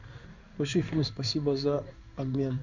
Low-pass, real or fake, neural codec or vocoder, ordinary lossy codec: 7.2 kHz; fake; codec, 16 kHz in and 24 kHz out, 1 kbps, XY-Tokenizer; Opus, 64 kbps